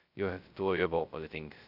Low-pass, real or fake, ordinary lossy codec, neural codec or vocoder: 5.4 kHz; fake; AAC, 32 kbps; codec, 16 kHz, 0.2 kbps, FocalCodec